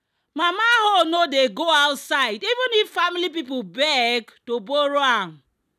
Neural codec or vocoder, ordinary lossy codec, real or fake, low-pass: none; none; real; 14.4 kHz